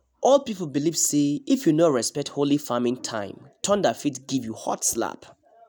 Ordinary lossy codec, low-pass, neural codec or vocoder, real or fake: none; none; none; real